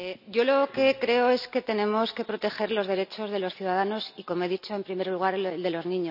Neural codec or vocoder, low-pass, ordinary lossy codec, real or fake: none; 5.4 kHz; none; real